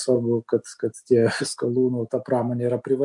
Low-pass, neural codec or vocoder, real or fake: 10.8 kHz; none; real